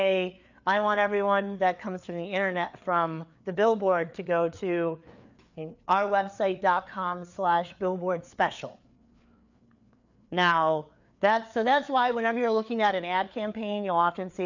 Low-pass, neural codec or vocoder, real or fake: 7.2 kHz; codec, 16 kHz, 4 kbps, FreqCodec, larger model; fake